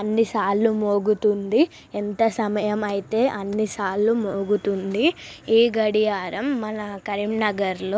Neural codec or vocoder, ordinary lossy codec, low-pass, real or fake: none; none; none; real